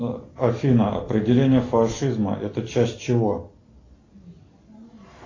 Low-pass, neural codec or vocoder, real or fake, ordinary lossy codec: 7.2 kHz; none; real; AAC, 32 kbps